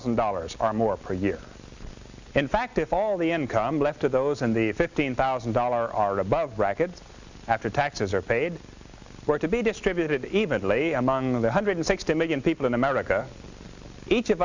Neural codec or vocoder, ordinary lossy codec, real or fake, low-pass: none; Opus, 64 kbps; real; 7.2 kHz